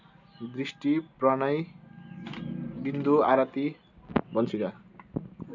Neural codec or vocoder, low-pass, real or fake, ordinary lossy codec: none; 7.2 kHz; real; none